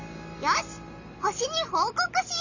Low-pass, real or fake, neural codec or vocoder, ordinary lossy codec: 7.2 kHz; real; none; none